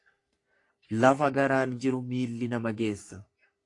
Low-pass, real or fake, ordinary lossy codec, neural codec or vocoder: 10.8 kHz; fake; AAC, 48 kbps; codec, 44.1 kHz, 3.4 kbps, Pupu-Codec